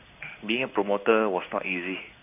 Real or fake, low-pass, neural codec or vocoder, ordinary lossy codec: fake; 3.6 kHz; codec, 16 kHz in and 24 kHz out, 1 kbps, XY-Tokenizer; none